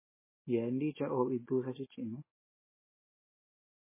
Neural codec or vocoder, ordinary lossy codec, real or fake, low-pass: none; MP3, 16 kbps; real; 3.6 kHz